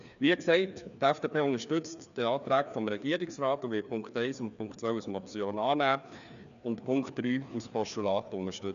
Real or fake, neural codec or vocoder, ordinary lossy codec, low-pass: fake; codec, 16 kHz, 2 kbps, FreqCodec, larger model; none; 7.2 kHz